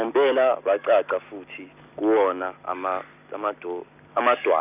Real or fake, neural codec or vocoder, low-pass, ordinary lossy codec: real; none; 3.6 kHz; none